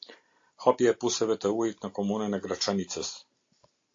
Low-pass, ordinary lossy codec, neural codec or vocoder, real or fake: 7.2 kHz; AAC, 48 kbps; none; real